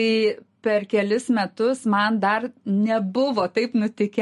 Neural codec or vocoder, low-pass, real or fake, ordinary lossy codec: none; 14.4 kHz; real; MP3, 48 kbps